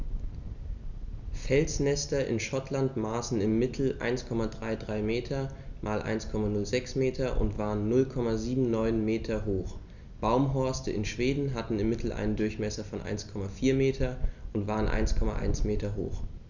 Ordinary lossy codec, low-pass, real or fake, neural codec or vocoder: none; 7.2 kHz; real; none